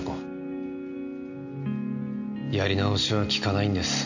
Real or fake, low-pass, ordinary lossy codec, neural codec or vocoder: real; 7.2 kHz; none; none